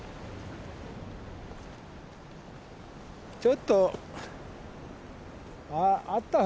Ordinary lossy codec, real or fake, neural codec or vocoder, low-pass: none; real; none; none